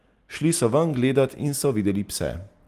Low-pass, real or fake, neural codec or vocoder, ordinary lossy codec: 14.4 kHz; real; none; Opus, 24 kbps